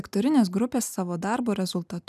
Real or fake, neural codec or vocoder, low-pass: real; none; 14.4 kHz